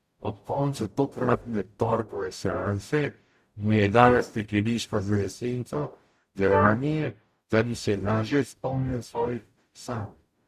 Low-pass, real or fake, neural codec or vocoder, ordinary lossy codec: 14.4 kHz; fake; codec, 44.1 kHz, 0.9 kbps, DAC; Opus, 64 kbps